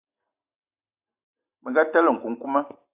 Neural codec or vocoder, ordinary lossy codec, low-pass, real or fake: none; AAC, 32 kbps; 3.6 kHz; real